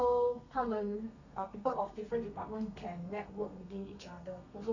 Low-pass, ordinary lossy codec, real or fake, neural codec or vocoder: 7.2 kHz; none; fake; codec, 32 kHz, 1.9 kbps, SNAC